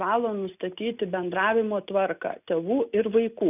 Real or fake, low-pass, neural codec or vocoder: real; 3.6 kHz; none